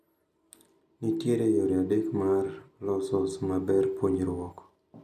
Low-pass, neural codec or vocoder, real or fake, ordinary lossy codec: 14.4 kHz; none; real; none